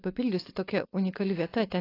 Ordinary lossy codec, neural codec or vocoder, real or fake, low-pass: AAC, 24 kbps; codec, 16 kHz, 4.8 kbps, FACodec; fake; 5.4 kHz